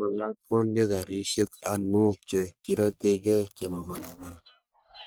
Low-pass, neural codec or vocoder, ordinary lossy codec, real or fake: none; codec, 44.1 kHz, 1.7 kbps, Pupu-Codec; none; fake